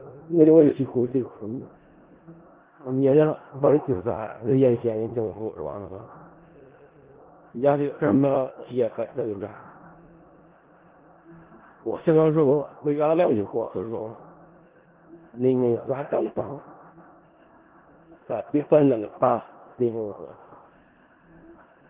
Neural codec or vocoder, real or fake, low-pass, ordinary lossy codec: codec, 16 kHz in and 24 kHz out, 0.4 kbps, LongCat-Audio-Codec, four codebook decoder; fake; 3.6 kHz; Opus, 16 kbps